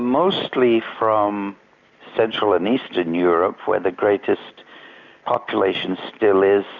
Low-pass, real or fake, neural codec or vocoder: 7.2 kHz; real; none